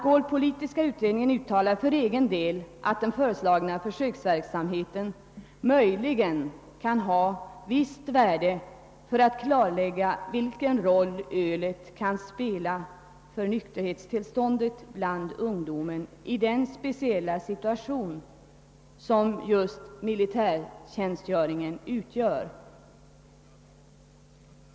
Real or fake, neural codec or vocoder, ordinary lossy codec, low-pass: real; none; none; none